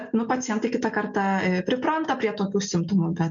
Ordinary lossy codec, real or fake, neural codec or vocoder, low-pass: MP3, 64 kbps; real; none; 7.2 kHz